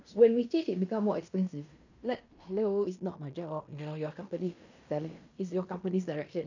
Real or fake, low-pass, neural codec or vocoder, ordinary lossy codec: fake; 7.2 kHz; codec, 16 kHz in and 24 kHz out, 0.9 kbps, LongCat-Audio-Codec, fine tuned four codebook decoder; none